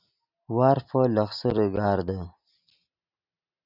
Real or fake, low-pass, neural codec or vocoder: real; 5.4 kHz; none